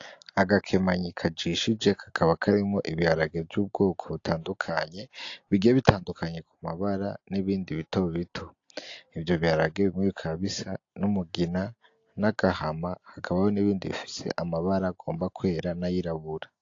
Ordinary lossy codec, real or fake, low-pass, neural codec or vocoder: AAC, 48 kbps; real; 7.2 kHz; none